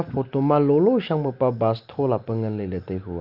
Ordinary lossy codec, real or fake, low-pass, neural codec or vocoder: Opus, 24 kbps; real; 5.4 kHz; none